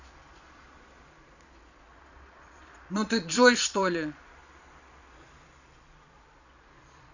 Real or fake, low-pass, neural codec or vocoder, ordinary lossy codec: fake; 7.2 kHz; vocoder, 22.05 kHz, 80 mel bands, Vocos; none